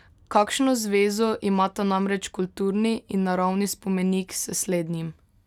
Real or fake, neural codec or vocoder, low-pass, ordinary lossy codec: real; none; 19.8 kHz; none